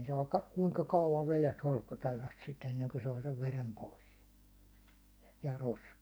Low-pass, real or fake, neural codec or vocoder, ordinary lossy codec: none; fake; codec, 44.1 kHz, 2.6 kbps, SNAC; none